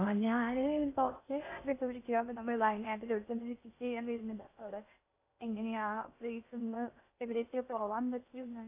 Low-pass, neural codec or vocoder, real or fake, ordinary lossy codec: 3.6 kHz; codec, 16 kHz in and 24 kHz out, 0.6 kbps, FocalCodec, streaming, 2048 codes; fake; AAC, 24 kbps